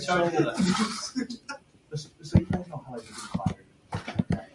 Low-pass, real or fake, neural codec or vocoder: 10.8 kHz; real; none